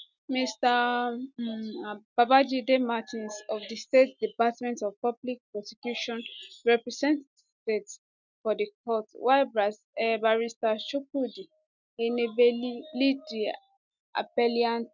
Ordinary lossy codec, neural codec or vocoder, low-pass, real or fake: none; none; 7.2 kHz; real